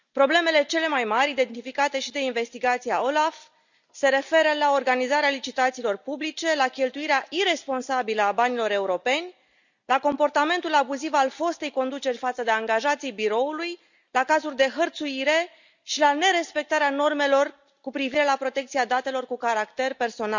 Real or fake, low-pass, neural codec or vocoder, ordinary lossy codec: real; 7.2 kHz; none; none